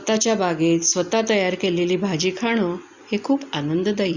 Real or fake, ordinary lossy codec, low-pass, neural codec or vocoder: real; Opus, 64 kbps; 7.2 kHz; none